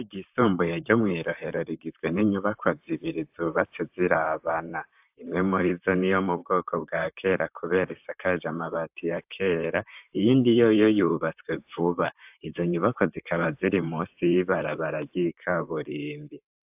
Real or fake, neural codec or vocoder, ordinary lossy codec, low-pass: fake; vocoder, 44.1 kHz, 128 mel bands, Pupu-Vocoder; AAC, 32 kbps; 3.6 kHz